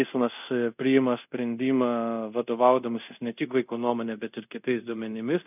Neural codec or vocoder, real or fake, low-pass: codec, 24 kHz, 0.5 kbps, DualCodec; fake; 3.6 kHz